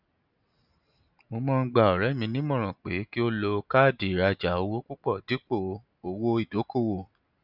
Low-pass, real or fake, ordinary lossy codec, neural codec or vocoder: 5.4 kHz; real; none; none